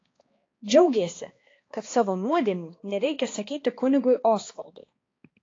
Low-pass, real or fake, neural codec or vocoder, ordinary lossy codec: 7.2 kHz; fake; codec, 16 kHz, 2 kbps, X-Codec, HuBERT features, trained on balanced general audio; AAC, 32 kbps